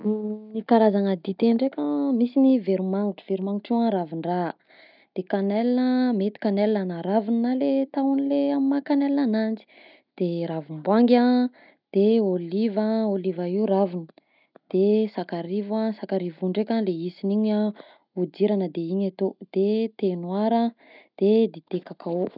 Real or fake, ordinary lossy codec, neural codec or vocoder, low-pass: real; none; none; 5.4 kHz